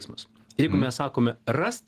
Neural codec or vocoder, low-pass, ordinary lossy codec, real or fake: none; 14.4 kHz; Opus, 16 kbps; real